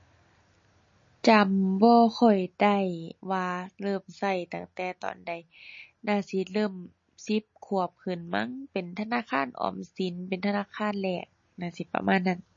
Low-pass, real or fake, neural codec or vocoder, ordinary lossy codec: 7.2 kHz; real; none; MP3, 32 kbps